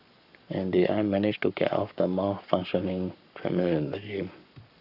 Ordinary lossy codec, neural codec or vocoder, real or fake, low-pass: none; codec, 44.1 kHz, 7.8 kbps, Pupu-Codec; fake; 5.4 kHz